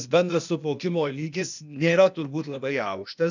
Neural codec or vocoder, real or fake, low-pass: codec, 16 kHz, 0.8 kbps, ZipCodec; fake; 7.2 kHz